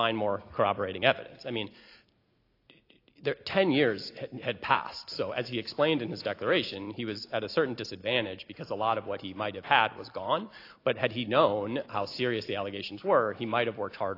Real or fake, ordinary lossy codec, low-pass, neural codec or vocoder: real; AAC, 32 kbps; 5.4 kHz; none